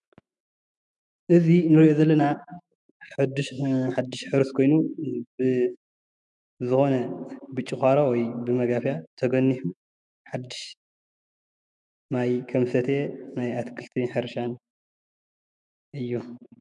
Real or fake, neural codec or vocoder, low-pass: fake; autoencoder, 48 kHz, 128 numbers a frame, DAC-VAE, trained on Japanese speech; 10.8 kHz